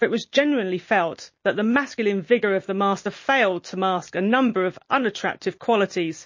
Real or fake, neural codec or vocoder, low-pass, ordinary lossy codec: real; none; 7.2 kHz; MP3, 32 kbps